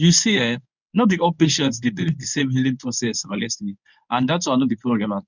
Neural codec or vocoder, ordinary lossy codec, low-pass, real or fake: codec, 24 kHz, 0.9 kbps, WavTokenizer, medium speech release version 1; none; 7.2 kHz; fake